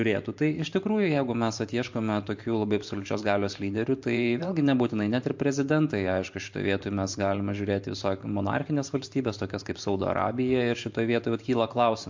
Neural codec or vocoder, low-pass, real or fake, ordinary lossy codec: vocoder, 44.1 kHz, 128 mel bands every 512 samples, BigVGAN v2; 7.2 kHz; fake; MP3, 48 kbps